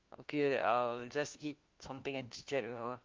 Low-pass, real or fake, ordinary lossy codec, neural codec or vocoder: 7.2 kHz; fake; Opus, 24 kbps; codec, 16 kHz, 1 kbps, FunCodec, trained on LibriTTS, 50 frames a second